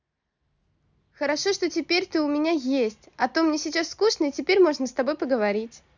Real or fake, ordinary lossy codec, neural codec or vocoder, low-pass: real; none; none; 7.2 kHz